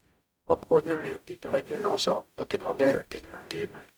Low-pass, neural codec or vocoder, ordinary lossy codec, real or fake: none; codec, 44.1 kHz, 0.9 kbps, DAC; none; fake